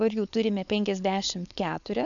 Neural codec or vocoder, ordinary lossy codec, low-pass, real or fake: codec, 16 kHz, 4.8 kbps, FACodec; MP3, 96 kbps; 7.2 kHz; fake